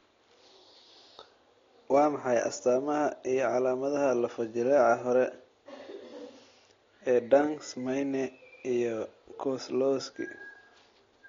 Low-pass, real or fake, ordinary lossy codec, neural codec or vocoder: 7.2 kHz; real; AAC, 32 kbps; none